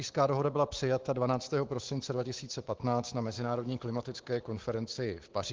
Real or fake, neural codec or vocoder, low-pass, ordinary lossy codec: fake; autoencoder, 48 kHz, 128 numbers a frame, DAC-VAE, trained on Japanese speech; 7.2 kHz; Opus, 16 kbps